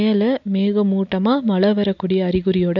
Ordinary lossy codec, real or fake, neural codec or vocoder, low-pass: none; real; none; 7.2 kHz